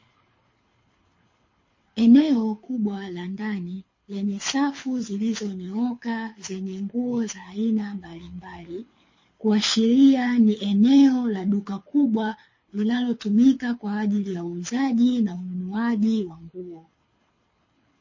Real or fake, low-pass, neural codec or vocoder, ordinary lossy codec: fake; 7.2 kHz; codec, 24 kHz, 6 kbps, HILCodec; MP3, 32 kbps